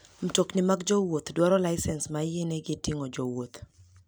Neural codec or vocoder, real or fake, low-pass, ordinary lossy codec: none; real; none; none